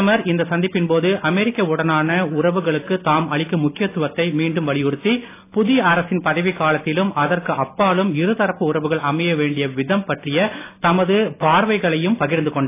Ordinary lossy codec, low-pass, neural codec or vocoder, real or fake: AAC, 24 kbps; 3.6 kHz; none; real